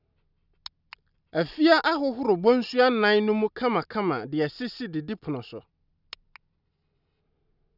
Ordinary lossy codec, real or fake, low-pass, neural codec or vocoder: none; real; 5.4 kHz; none